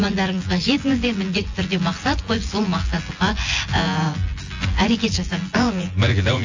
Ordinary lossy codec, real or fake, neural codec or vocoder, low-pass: AAC, 48 kbps; fake; vocoder, 24 kHz, 100 mel bands, Vocos; 7.2 kHz